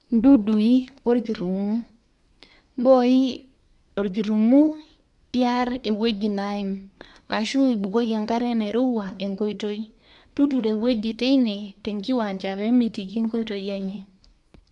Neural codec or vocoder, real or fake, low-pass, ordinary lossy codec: codec, 24 kHz, 1 kbps, SNAC; fake; 10.8 kHz; MP3, 96 kbps